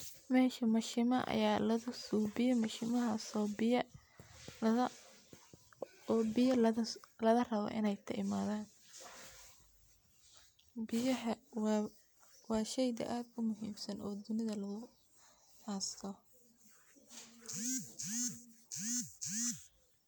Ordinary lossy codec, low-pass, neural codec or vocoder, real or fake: none; none; none; real